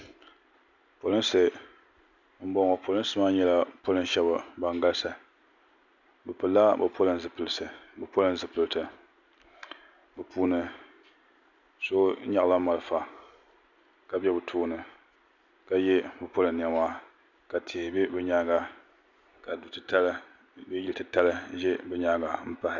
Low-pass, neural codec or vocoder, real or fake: 7.2 kHz; none; real